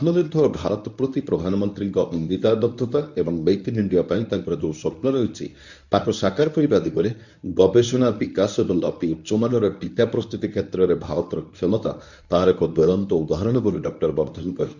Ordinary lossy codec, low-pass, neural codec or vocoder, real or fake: none; 7.2 kHz; codec, 24 kHz, 0.9 kbps, WavTokenizer, medium speech release version 1; fake